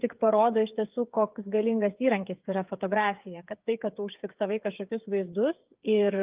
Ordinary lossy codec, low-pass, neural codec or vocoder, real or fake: Opus, 24 kbps; 3.6 kHz; codec, 16 kHz, 8 kbps, FreqCodec, smaller model; fake